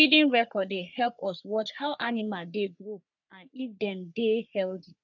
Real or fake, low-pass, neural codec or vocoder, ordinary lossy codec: fake; 7.2 kHz; codec, 44.1 kHz, 3.4 kbps, Pupu-Codec; none